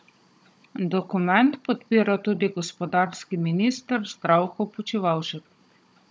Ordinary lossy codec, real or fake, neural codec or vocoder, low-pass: none; fake; codec, 16 kHz, 16 kbps, FunCodec, trained on Chinese and English, 50 frames a second; none